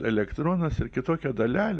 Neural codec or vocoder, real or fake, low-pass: none; real; 9.9 kHz